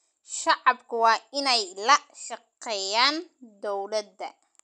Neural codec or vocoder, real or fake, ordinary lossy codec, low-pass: none; real; none; 10.8 kHz